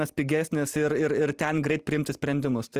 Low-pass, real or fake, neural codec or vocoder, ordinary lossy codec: 14.4 kHz; real; none; Opus, 24 kbps